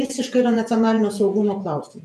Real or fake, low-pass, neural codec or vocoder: fake; 14.4 kHz; vocoder, 48 kHz, 128 mel bands, Vocos